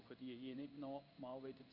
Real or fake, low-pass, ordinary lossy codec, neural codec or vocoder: fake; 5.4 kHz; none; codec, 16 kHz in and 24 kHz out, 1 kbps, XY-Tokenizer